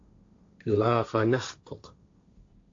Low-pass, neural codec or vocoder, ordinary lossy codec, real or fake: 7.2 kHz; codec, 16 kHz, 1.1 kbps, Voila-Tokenizer; AAC, 48 kbps; fake